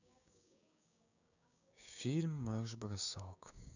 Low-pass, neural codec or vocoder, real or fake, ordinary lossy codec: 7.2 kHz; codec, 16 kHz, 6 kbps, DAC; fake; none